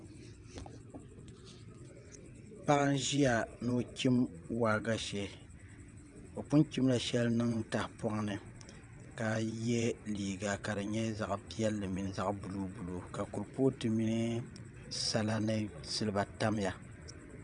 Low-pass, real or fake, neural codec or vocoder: 9.9 kHz; fake; vocoder, 22.05 kHz, 80 mel bands, WaveNeXt